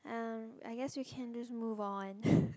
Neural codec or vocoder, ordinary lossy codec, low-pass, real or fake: none; none; none; real